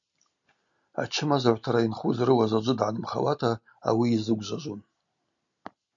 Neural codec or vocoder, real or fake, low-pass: none; real; 7.2 kHz